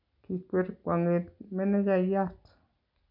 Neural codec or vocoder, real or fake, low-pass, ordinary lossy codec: none; real; 5.4 kHz; none